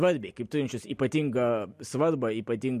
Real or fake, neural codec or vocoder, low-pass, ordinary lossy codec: real; none; 14.4 kHz; MP3, 64 kbps